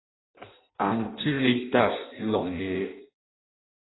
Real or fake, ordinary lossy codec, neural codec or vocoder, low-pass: fake; AAC, 16 kbps; codec, 16 kHz in and 24 kHz out, 0.6 kbps, FireRedTTS-2 codec; 7.2 kHz